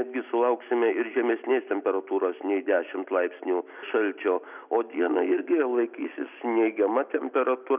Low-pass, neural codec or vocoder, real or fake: 3.6 kHz; none; real